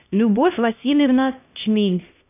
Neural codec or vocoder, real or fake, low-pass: codec, 16 kHz, 1 kbps, X-Codec, HuBERT features, trained on LibriSpeech; fake; 3.6 kHz